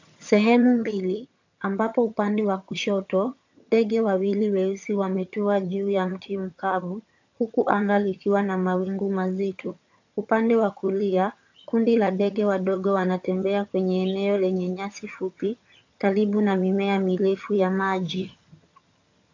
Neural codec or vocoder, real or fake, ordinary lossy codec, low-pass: vocoder, 22.05 kHz, 80 mel bands, HiFi-GAN; fake; AAC, 48 kbps; 7.2 kHz